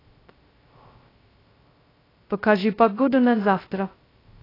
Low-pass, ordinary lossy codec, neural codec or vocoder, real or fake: 5.4 kHz; AAC, 24 kbps; codec, 16 kHz, 0.2 kbps, FocalCodec; fake